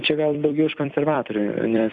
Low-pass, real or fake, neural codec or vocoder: 10.8 kHz; fake; vocoder, 44.1 kHz, 128 mel bands every 256 samples, BigVGAN v2